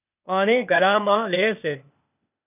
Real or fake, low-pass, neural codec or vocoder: fake; 3.6 kHz; codec, 16 kHz, 0.8 kbps, ZipCodec